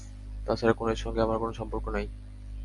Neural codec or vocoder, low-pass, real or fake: none; 10.8 kHz; real